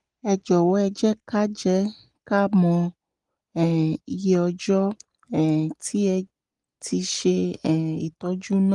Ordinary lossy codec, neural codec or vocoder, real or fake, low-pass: Opus, 24 kbps; none; real; 10.8 kHz